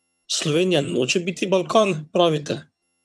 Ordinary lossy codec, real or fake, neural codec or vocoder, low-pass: none; fake; vocoder, 22.05 kHz, 80 mel bands, HiFi-GAN; none